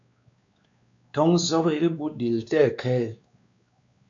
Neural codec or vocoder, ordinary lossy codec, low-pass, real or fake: codec, 16 kHz, 2 kbps, X-Codec, WavLM features, trained on Multilingual LibriSpeech; AAC, 64 kbps; 7.2 kHz; fake